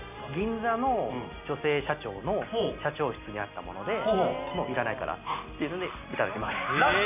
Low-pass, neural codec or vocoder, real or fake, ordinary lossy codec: 3.6 kHz; none; real; none